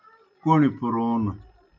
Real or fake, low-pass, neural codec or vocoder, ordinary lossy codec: real; 7.2 kHz; none; MP3, 64 kbps